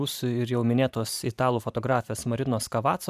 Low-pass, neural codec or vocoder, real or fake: 14.4 kHz; vocoder, 44.1 kHz, 128 mel bands every 512 samples, BigVGAN v2; fake